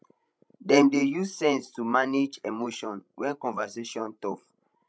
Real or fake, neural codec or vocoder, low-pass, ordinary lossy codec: fake; codec, 16 kHz, 16 kbps, FreqCodec, larger model; none; none